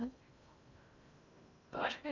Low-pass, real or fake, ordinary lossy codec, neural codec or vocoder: 7.2 kHz; fake; none; codec, 16 kHz in and 24 kHz out, 0.6 kbps, FocalCodec, streaming, 2048 codes